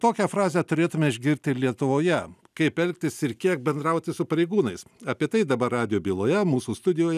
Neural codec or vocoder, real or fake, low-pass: none; real; 14.4 kHz